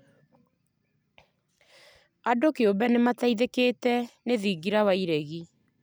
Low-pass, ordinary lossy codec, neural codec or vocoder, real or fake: none; none; none; real